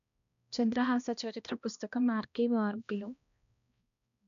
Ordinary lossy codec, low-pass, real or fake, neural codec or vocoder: none; 7.2 kHz; fake; codec, 16 kHz, 1 kbps, X-Codec, HuBERT features, trained on balanced general audio